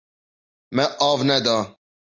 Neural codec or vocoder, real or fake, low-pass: none; real; 7.2 kHz